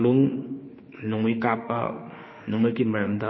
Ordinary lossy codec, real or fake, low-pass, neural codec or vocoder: MP3, 24 kbps; fake; 7.2 kHz; autoencoder, 48 kHz, 32 numbers a frame, DAC-VAE, trained on Japanese speech